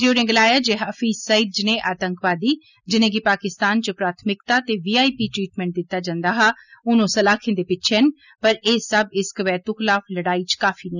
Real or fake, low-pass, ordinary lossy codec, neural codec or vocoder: real; 7.2 kHz; none; none